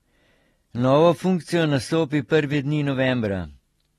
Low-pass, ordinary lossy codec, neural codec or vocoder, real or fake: 14.4 kHz; AAC, 32 kbps; none; real